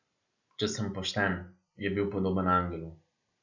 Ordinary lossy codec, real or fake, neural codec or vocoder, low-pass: none; real; none; 7.2 kHz